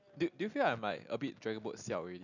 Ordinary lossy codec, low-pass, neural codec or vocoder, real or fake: none; 7.2 kHz; none; real